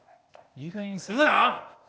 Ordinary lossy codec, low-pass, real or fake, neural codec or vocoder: none; none; fake; codec, 16 kHz, 0.8 kbps, ZipCodec